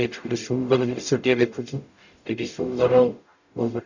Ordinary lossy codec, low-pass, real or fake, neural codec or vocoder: none; 7.2 kHz; fake; codec, 44.1 kHz, 0.9 kbps, DAC